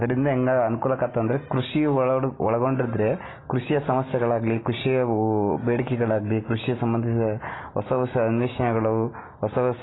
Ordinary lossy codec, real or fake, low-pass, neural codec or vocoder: AAC, 16 kbps; real; 7.2 kHz; none